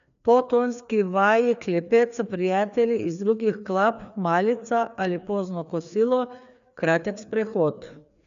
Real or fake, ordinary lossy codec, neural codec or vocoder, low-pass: fake; none; codec, 16 kHz, 2 kbps, FreqCodec, larger model; 7.2 kHz